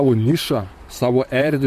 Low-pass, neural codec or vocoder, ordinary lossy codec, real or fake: 14.4 kHz; vocoder, 44.1 kHz, 128 mel bands, Pupu-Vocoder; MP3, 64 kbps; fake